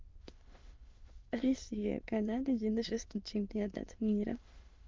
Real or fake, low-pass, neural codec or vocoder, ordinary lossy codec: fake; 7.2 kHz; autoencoder, 22.05 kHz, a latent of 192 numbers a frame, VITS, trained on many speakers; Opus, 32 kbps